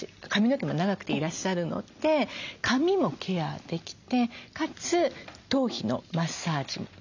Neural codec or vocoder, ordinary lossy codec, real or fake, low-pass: none; none; real; 7.2 kHz